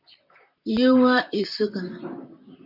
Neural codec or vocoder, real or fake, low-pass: vocoder, 44.1 kHz, 128 mel bands, Pupu-Vocoder; fake; 5.4 kHz